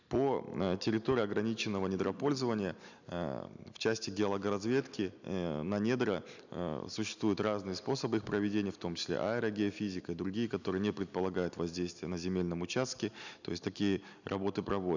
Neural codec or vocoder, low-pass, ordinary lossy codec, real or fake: none; 7.2 kHz; none; real